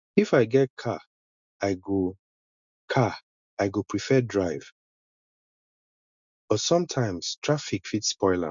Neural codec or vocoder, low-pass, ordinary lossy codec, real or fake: none; 7.2 kHz; MP3, 64 kbps; real